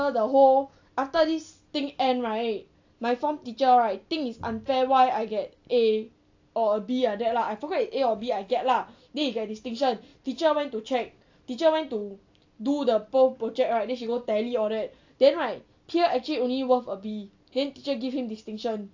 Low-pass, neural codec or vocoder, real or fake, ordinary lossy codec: 7.2 kHz; none; real; AAC, 48 kbps